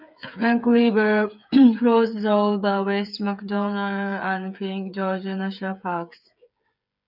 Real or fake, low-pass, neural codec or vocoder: fake; 5.4 kHz; codec, 16 kHz, 8 kbps, FreqCodec, smaller model